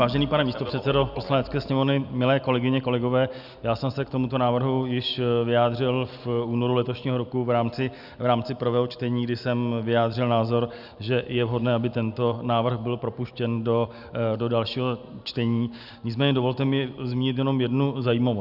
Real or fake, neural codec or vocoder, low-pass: real; none; 5.4 kHz